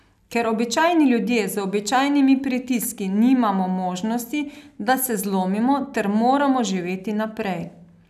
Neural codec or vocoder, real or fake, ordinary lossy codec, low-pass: none; real; none; 14.4 kHz